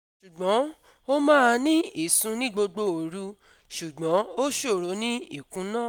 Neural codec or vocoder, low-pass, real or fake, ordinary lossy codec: none; none; real; none